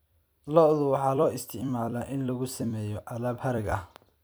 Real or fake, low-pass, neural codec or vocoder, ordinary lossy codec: fake; none; vocoder, 44.1 kHz, 128 mel bands every 256 samples, BigVGAN v2; none